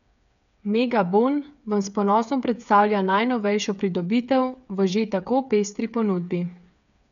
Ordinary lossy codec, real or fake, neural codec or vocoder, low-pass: none; fake; codec, 16 kHz, 8 kbps, FreqCodec, smaller model; 7.2 kHz